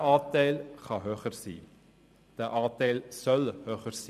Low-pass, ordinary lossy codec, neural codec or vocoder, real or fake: 14.4 kHz; none; vocoder, 44.1 kHz, 128 mel bands every 256 samples, BigVGAN v2; fake